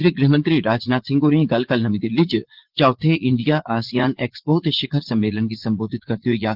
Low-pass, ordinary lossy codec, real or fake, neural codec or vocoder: 5.4 kHz; Opus, 16 kbps; fake; vocoder, 44.1 kHz, 80 mel bands, Vocos